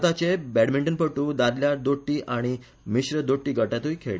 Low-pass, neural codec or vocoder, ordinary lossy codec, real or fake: none; none; none; real